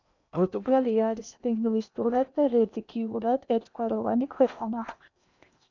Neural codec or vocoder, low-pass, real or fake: codec, 16 kHz in and 24 kHz out, 0.8 kbps, FocalCodec, streaming, 65536 codes; 7.2 kHz; fake